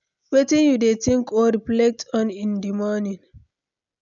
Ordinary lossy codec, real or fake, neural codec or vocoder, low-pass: none; real; none; 7.2 kHz